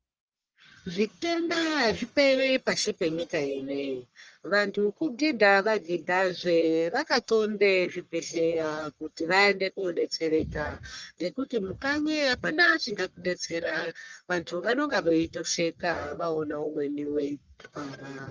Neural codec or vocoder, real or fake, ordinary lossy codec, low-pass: codec, 44.1 kHz, 1.7 kbps, Pupu-Codec; fake; Opus, 24 kbps; 7.2 kHz